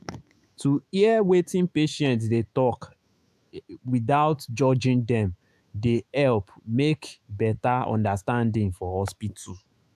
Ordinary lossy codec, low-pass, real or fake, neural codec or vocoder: AAC, 96 kbps; 14.4 kHz; fake; autoencoder, 48 kHz, 128 numbers a frame, DAC-VAE, trained on Japanese speech